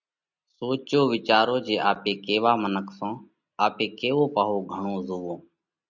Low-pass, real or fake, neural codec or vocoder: 7.2 kHz; real; none